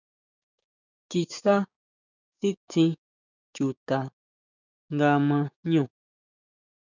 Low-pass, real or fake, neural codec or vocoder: 7.2 kHz; fake; codec, 16 kHz, 6 kbps, DAC